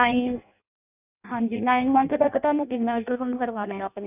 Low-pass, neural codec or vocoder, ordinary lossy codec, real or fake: 3.6 kHz; codec, 16 kHz in and 24 kHz out, 0.6 kbps, FireRedTTS-2 codec; none; fake